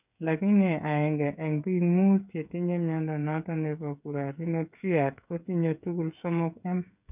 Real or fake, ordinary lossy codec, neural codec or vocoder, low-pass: fake; none; codec, 16 kHz, 8 kbps, FreqCodec, smaller model; 3.6 kHz